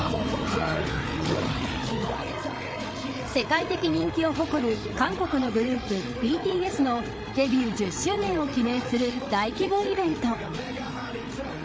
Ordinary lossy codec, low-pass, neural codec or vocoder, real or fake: none; none; codec, 16 kHz, 8 kbps, FreqCodec, larger model; fake